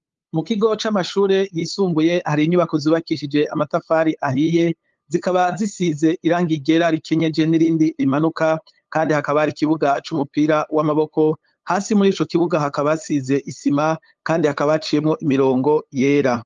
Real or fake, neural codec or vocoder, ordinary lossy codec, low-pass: fake; codec, 16 kHz, 8 kbps, FunCodec, trained on LibriTTS, 25 frames a second; Opus, 32 kbps; 7.2 kHz